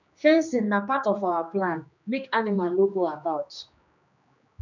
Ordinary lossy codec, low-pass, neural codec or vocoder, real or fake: none; 7.2 kHz; codec, 16 kHz, 2 kbps, X-Codec, HuBERT features, trained on general audio; fake